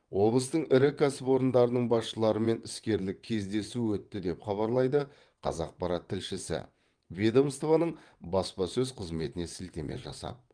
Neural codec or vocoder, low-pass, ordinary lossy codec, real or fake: vocoder, 22.05 kHz, 80 mel bands, WaveNeXt; 9.9 kHz; Opus, 32 kbps; fake